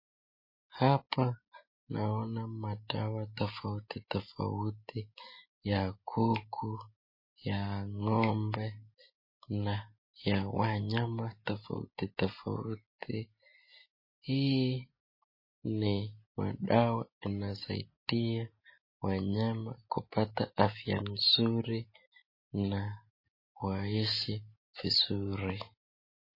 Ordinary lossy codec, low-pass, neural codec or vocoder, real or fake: MP3, 24 kbps; 5.4 kHz; none; real